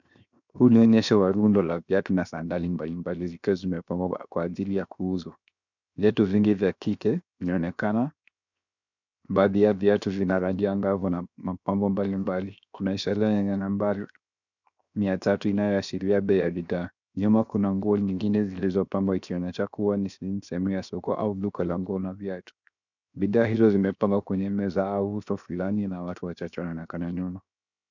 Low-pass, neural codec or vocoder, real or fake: 7.2 kHz; codec, 16 kHz, 0.7 kbps, FocalCodec; fake